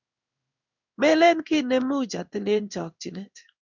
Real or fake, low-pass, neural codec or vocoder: fake; 7.2 kHz; codec, 16 kHz in and 24 kHz out, 1 kbps, XY-Tokenizer